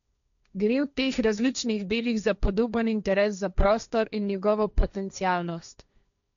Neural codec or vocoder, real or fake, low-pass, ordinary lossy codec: codec, 16 kHz, 1.1 kbps, Voila-Tokenizer; fake; 7.2 kHz; none